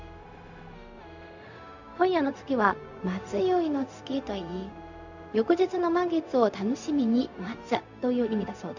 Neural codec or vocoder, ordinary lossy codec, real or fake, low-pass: codec, 16 kHz, 0.4 kbps, LongCat-Audio-Codec; none; fake; 7.2 kHz